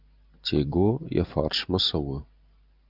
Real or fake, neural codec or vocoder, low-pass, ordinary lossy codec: real; none; 5.4 kHz; Opus, 32 kbps